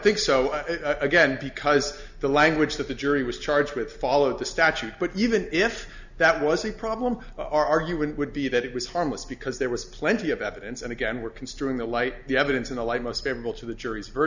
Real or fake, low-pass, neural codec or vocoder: real; 7.2 kHz; none